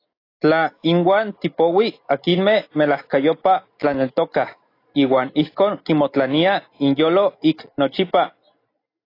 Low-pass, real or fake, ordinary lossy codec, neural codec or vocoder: 5.4 kHz; real; AAC, 32 kbps; none